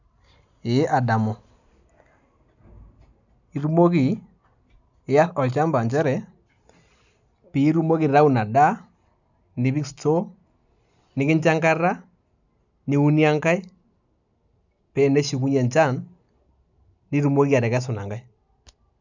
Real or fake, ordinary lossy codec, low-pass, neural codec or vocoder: real; none; 7.2 kHz; none